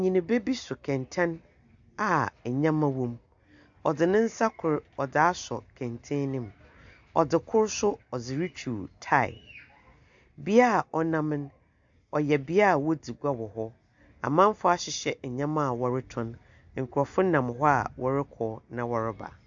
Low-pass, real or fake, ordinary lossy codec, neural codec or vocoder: 7.2 kHz; real; AAC, 64 kbps; none